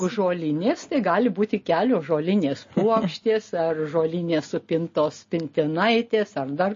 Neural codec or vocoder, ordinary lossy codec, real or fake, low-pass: none; MP3, 32 kbps; real; 7.2 kHz